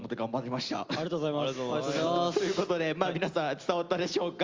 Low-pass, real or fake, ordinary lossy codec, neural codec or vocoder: 7.2 kHz; real; Opus, 32 kbps; none